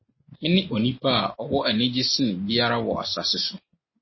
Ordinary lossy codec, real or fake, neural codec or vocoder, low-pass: MP3, 24 kbps; real; none; 7.2 kHz